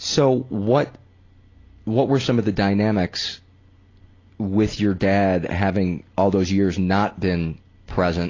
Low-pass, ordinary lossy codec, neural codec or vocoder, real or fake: 7.2 kHz; AAC, 32 kbps; none; real